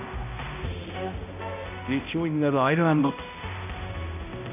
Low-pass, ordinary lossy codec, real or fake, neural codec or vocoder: 3.6 kHz; none; fake; codec, 16 kHz, 0.5 kbps, X-Codec, HuBERT features, trained on balanced general audio